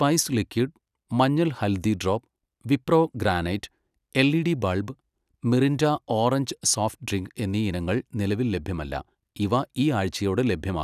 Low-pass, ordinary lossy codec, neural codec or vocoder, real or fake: 14.4 kHz; none; none; real